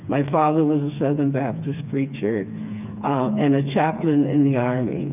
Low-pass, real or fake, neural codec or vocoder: 3.6 kHz; fake; codec, 16 kHz, 4 kbps, FreqCodec, smaller model